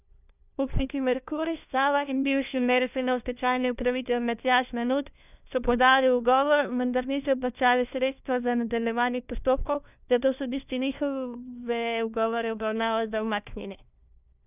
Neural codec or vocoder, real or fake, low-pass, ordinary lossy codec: codec, 16 kHz, 1 kbps, FunCodec, trained on LibriTTS, 50 frames a second; fake; 3.6 kHz; none